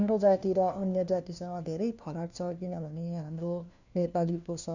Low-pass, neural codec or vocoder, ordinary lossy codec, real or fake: 7.2 kHz; codec, 16 kHz, 1 kbps, FunCodec, trained on LibriTTS, 50 frames a second; none; fake